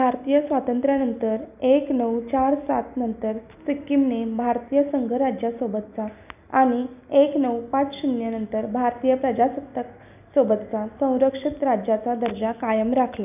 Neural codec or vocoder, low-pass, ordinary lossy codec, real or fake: none; 3.6 kHz; none; real